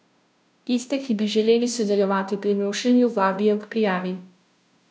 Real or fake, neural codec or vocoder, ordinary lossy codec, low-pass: fake; codec, 16 kHz, 0.5 kbps, FunCodec, trained on Chinese and English, 25 frames a second; none; none